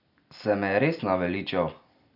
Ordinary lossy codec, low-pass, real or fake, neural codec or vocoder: none; 5.4 kHz; real; none